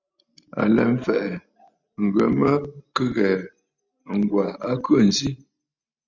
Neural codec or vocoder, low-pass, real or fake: none; 7.2 kHz; real